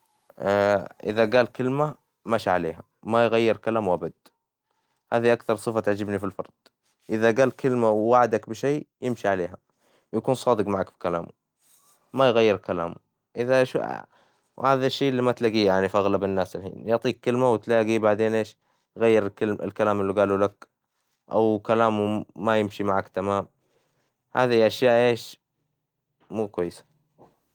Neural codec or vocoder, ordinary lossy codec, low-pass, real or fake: none; Opus, 24 kbps; 19.8 kHz; real